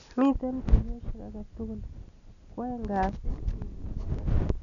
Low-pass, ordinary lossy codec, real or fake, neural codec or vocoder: 7.2 kHz; none; real; none